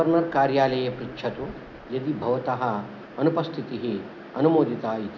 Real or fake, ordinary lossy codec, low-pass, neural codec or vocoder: real; none; 7.2 kHz; none